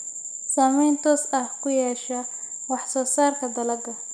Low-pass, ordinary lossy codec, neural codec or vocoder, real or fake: none; none; none; real